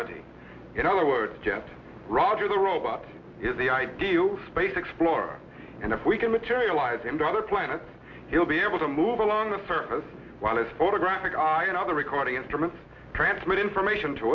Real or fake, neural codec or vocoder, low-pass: real; none; 7.2 kHz